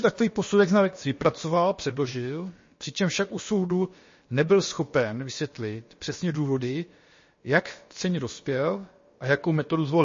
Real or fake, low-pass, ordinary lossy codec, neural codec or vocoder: fake; 7.2 kHz; MP3, 32 kbps; codec, 16 kHz, about 1 kbps, DyCAST, with the encoder's durations